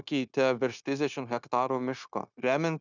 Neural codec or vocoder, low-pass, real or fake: codec, 16 kHz, 0.9 kbps, LongCat-Audio-Codec; 7.2 kHz; fake